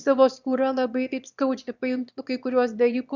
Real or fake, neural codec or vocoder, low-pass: fake; autoencoder, 22.05 kHz, a latent of 192 numbers a frame, VITS, trained on one speaker; 7.2 kHz